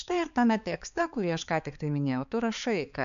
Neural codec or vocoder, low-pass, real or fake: codec, 16 kHz, 4 kbps, X-Codec, HuBERT features, trained on balanced general audio; 7.2 kHz; fake